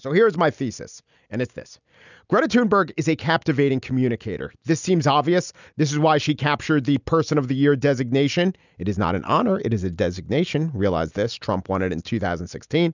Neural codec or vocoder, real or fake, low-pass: none; real; 7.2 kHz